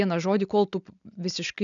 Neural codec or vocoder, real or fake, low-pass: none; real; 7.2 kHz